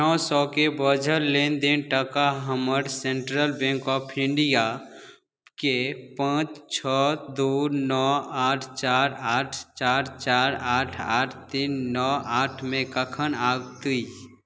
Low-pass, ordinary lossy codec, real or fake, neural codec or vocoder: none; none; real; none